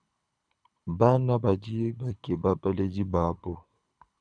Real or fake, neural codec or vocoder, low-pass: fake; codec, 24 kHz, 6 kbps, HILCodec; 9.9 kHz